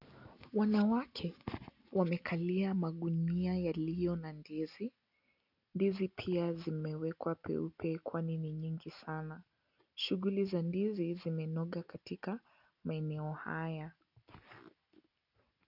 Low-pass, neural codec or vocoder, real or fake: 5.4 kHz; none; real